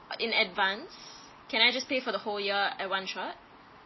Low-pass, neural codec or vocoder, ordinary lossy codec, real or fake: 7.2 kHz; none; MP3, 24 kbps; real